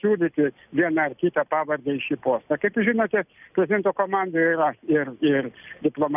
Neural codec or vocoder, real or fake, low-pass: none; real; 3.6 kHz